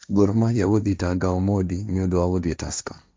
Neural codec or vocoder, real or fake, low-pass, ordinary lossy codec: codec, 16 kHz, 1.1 kbps, Voila-Tokenizer; fake; none; none